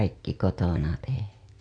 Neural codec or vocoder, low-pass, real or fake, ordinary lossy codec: none; 9.9 kHz; real; none